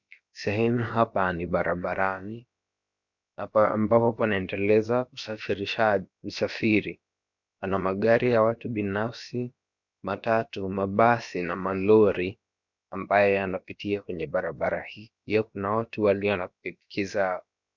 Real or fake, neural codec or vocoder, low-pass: fake; codec, 16 kHz, about 1 kbps, DyCAST, with the encoder's durations; 7.2 kHz